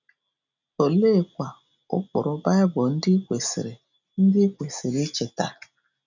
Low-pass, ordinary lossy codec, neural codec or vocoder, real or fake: 7.2 kHz; none; none; real